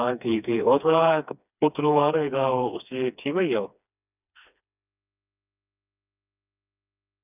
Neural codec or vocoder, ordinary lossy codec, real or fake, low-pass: codec, 16 kHz, 2 kbps, FreqCodec, smaller model; none; fake; 3.6 kHz